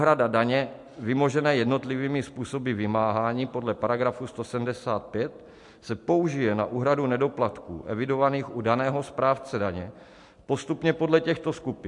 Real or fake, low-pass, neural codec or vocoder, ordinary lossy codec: real; 10.8 kHz; none; MP3, 64 kbps